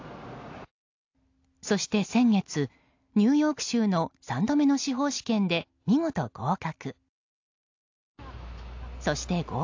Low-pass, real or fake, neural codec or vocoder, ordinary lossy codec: 7.2 kHz; real; none; AAC, 48 kbps